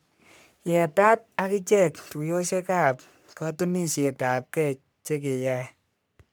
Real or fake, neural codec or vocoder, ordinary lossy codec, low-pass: fake; codec, 44.1 kHz, 3.4 kbps, Pupu-Codec; none; none